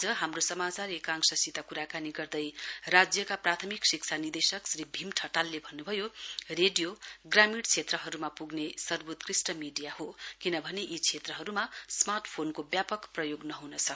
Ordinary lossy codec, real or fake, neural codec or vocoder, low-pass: none; real; none; none